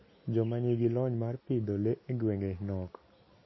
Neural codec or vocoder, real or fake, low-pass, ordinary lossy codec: none; real; 7.2 kHz; MP3, 24 kbps